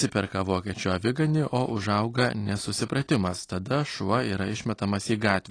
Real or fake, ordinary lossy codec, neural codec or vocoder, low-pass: real; AAC, 32 kbps; none; 9.9 kHz